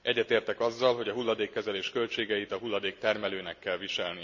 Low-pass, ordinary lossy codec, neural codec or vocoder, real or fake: 7.2 kHz; none; none; real